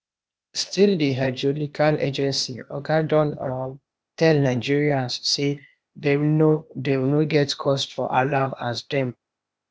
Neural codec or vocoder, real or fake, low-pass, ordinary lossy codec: codec, 16 kHz, 0.8 kbps, ZipCodec; fake; none; none